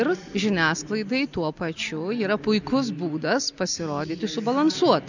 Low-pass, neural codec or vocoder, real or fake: 7.2 kHz; none; real